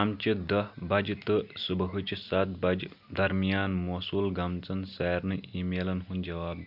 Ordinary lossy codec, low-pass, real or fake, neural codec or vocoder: none; 5.4 kHz; real; none